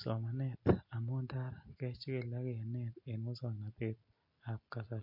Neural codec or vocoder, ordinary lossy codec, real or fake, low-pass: none; MP3, 32 kbps; real; 5.4 kHz